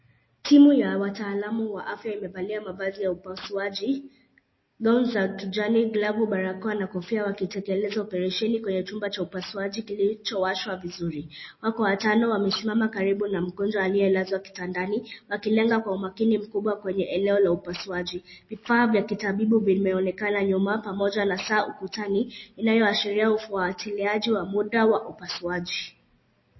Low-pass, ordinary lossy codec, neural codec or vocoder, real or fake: 7.2 kHz; MP3, 24 kbps; none; real